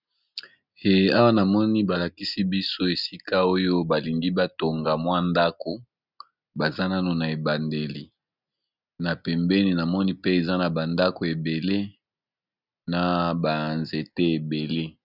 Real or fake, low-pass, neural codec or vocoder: real; 5.4 kHz; none